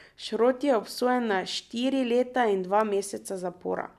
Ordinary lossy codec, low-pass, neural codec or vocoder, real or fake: none; 14.4 kHz; none; real